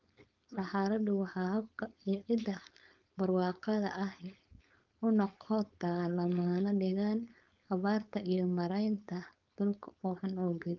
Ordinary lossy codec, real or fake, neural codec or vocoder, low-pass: Opus, 24 kbps; fake; codec, 16 kHz, 4.8 kbps, FACodec; 7.2 kHz